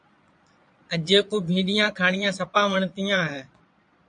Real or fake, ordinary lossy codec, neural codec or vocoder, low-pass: fake; AAC, 64 kbps; vocoder, 22.05 kHz, 80 mel bands, Vocos; 9.9 kHz